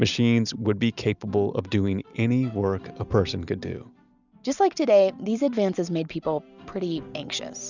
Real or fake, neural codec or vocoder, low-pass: real; none; 7.2 kHz